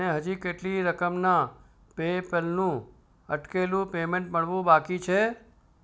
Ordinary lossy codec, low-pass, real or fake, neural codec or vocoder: none; none; real; none